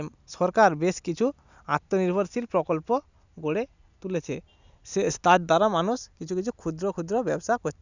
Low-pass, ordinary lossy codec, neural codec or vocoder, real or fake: 7.2 kHz; none; none; real